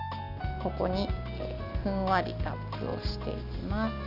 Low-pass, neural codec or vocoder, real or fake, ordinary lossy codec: 5.4 kHz; none; real; none